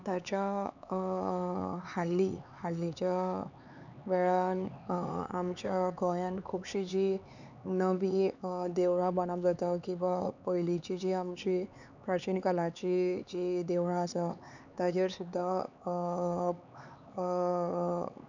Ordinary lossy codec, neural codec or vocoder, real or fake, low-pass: none; codec, 16 kHz, 4 kbps, X-Codec, HuBERT features, trained on LibriSpeech; fake; 7.2 kHz